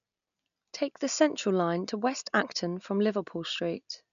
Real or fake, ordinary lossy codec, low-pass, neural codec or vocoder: real; none; 7.2 kHz; none